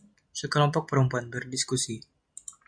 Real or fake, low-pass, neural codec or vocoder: real; 9.9 kHz; none